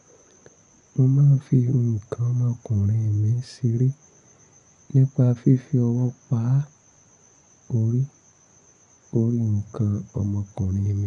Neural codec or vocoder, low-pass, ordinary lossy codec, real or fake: vocoder, 24 kHz, 100 mel bands, Vocos; 10.8 kHz; none; fake